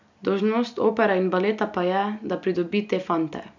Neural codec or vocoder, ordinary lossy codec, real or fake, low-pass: none; none; real; 7.2 kHz